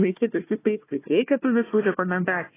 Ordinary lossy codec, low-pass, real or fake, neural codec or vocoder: AAC, 16 kbps; 3.6 kHz; fake; codec, 16 kHz, 1 kbps, FunCodec, trained on Chinese and English, 50 frames a second